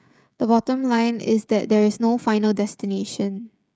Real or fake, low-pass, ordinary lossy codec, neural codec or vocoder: fake; none; none; codec, 16 kHz, 16 kbps, FreqCodec, smaller model